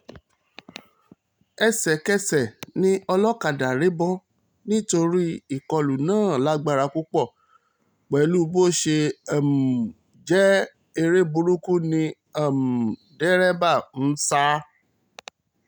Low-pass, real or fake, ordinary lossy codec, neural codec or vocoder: none; real; none; none